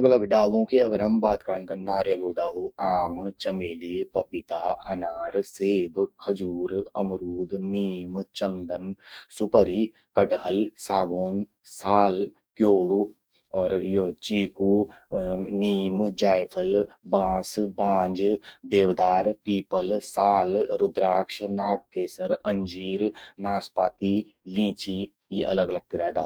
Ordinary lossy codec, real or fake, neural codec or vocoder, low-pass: none; fake; codec, 44.1 kHz, 2.6 kbps, DAC; none